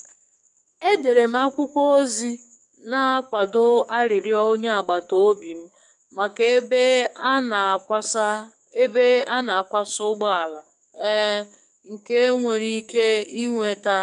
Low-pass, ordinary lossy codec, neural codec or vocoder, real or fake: 10.8 kHz; none; codec, 44.1 kHz, 2.6 kbps, SNAC; fake